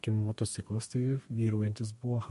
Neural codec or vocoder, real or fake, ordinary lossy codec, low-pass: codec, 32 kHz, 1.9 kbps, SNAC; fake; MP3, 48 kbps; 14.4 kHz